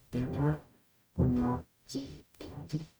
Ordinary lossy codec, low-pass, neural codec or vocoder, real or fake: none; none; codec, 44.1 kHz, 0.9 kbps, DAC; fake